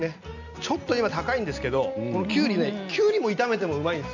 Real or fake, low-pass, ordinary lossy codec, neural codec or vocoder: real; 7.2 kHz; none; none